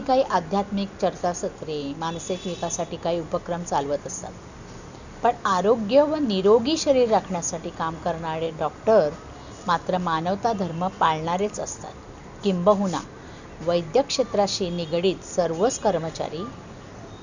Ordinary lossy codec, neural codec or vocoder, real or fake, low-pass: none; none; real; 7.2 kHz